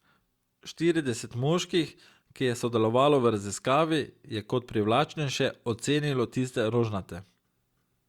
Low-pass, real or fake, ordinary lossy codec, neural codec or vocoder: 19.8 kHz; real; Opus, 64 kbps; none